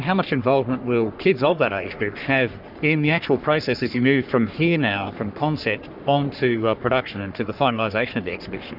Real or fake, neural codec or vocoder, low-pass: fake; codec, 44.1 kHz, 3.4 kbps, Pupu-Codec; 5.4 kHz